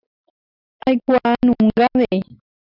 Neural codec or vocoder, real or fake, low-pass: none; real; 5.4 kHz